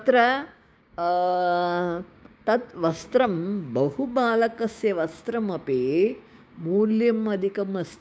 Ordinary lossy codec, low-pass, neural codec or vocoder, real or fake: none; none; codec, 16 kHz, 6 kbps, DAC; fake